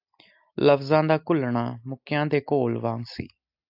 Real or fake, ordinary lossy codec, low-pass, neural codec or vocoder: real; AAC, 48 kbps; 5.4 kHz; none